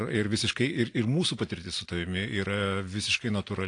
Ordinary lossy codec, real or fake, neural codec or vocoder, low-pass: AAC, 48 kbps; real; none; 9.9 kHz